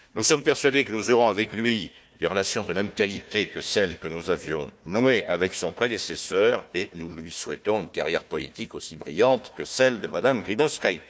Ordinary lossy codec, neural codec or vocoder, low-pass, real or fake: none; codec, 16 kHz, 1 kbps, FunCodec, trained on Chinese and English, 50 frames a second; none; fake